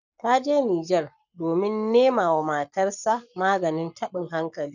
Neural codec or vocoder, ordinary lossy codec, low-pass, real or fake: codec, 44.1 kHz, 7.8 kbps, Pupu-Codec; none; 7.2 kHz; fake